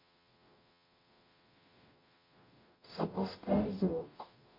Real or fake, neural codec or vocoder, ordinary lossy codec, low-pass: fake; codec, 44.1 kHz, 0.9 kbps, DAC; MP3, 32 kbps; 5.4 kHz